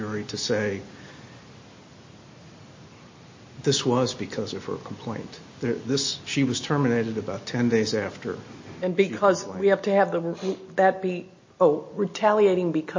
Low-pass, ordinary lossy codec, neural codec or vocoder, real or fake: 7.2 kHz; MP3, 32 kbps; none; real